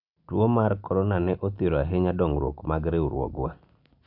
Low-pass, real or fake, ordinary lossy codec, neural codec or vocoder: 5.4 kHz; real; none; none